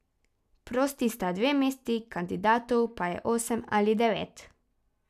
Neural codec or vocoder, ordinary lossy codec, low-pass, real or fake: none; none; 14.4 kHz; real